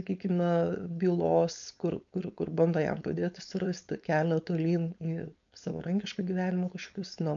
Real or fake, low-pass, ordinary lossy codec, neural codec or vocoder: fake; 7.2 kHz; MP3, 64 kbps; codec, 16 kHz, 4.8 kbps, FACodec